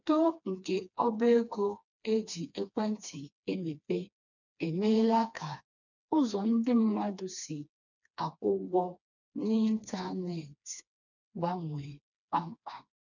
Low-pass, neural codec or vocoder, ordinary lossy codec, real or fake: 7.2 kHz; codec, 16 kHz, 2 kbps, FreqCodec, smaller model; none; fake